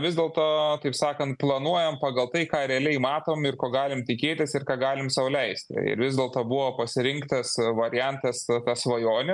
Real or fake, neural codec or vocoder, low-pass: real; none; 10.8 kHz